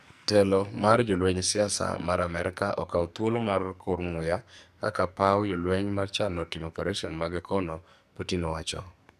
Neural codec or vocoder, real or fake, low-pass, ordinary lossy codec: codec, 44.1 kHz, 2.6 kbps, SNAC; fake; 14.4 kHz; none